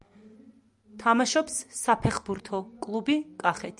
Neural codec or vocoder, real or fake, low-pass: none; real; 10.8 kHz